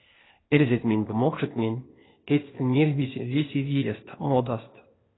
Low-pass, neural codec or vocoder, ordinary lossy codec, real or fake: 7.2 kHz; codec, 16 kHz, 0.8 kbps, ZipCodec; AAC, 16 kbps; fake